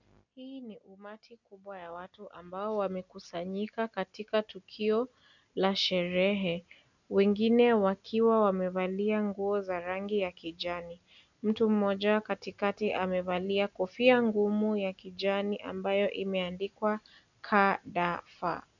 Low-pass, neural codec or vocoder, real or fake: 7.2 kHz; none; real